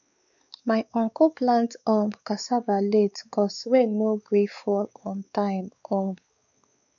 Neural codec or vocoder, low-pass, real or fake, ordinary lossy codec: codec, 16 kHz, 4 kbps, X-Codec, WavLM features, trained on Multilingual LibriSpeech; 7.2 kHz; fake; none